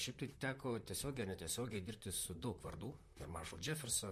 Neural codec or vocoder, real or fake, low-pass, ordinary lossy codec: vocoder, 44.1 kHz, 128 mel bands, Pupu-Vocoder; fake; 14.4 kHz; MP3, 64 kbps